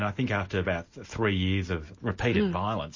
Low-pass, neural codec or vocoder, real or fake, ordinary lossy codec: 7.2 kHz; none; real; MP3, 32 kbps